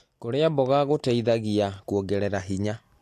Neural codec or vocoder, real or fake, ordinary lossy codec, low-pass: none; real; AAC, 64 kbps; 14.4 kHz